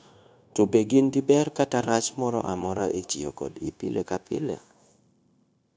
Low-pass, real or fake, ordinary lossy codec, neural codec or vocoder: none; fake; none; codec, 16 kHz, 0.9 kbps, LongCat-Audio-Codec